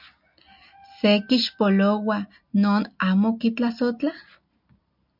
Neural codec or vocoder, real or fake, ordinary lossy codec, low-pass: none; real; MP3, 48 kbps; 5.4 kHz